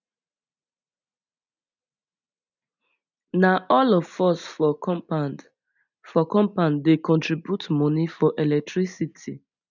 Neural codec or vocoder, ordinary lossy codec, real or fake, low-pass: none; none; real; 7.2 kHz